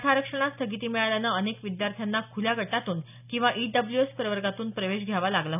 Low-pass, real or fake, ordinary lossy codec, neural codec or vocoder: 3.6 kHz; real; none; none